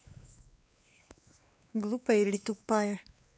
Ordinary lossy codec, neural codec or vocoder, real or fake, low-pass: none; codec, 16 kHz, 2 kbps, X-Codec, WavLM features, trained on Multilingual LibriSpeech; fake; none